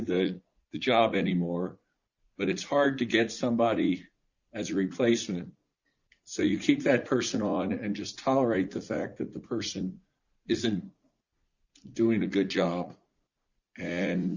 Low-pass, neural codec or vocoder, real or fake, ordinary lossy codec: 7.2 kHz; vocoder, 44.1 kHz, 80 mel bands, Vocos; fake; Opus, 64 kbps